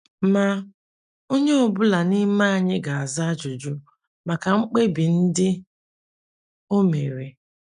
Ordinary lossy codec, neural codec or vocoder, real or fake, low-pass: none; none; real; 10.8 kHz